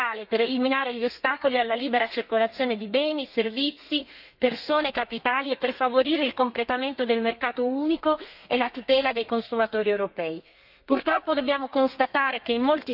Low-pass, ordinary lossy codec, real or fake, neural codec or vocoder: 5.4 kHz; none; fake; codec, 32 kHz, 1.9 kbps, SNAC